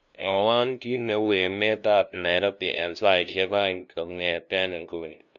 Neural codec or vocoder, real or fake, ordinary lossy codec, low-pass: codec, 16 kHz, 0.5 kbps, FunCodec, trained on LibriTTS, 25 frames a second; fake; none; 7.2 kHz